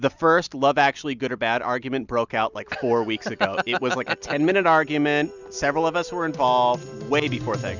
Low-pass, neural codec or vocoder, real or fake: 7.2 kHz; none; real